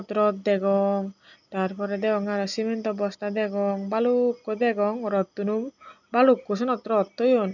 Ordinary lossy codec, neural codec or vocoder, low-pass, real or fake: none; none; 7.2 kHz; real